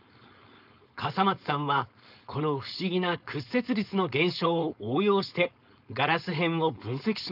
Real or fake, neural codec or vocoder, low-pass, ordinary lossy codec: fake; codec, 16 kHz, 4.8 kbps, FACodec; 5.4 kHz; none